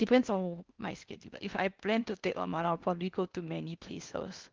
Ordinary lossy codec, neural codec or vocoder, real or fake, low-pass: Opus, 24 kbps; codec, 16 kHz in and 24 kHz out, 0.8 kbps, FocalCodec, streaming, 65536 codes; fake; 7.2 kHz